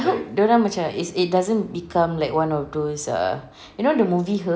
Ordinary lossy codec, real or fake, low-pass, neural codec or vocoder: none; real; none; none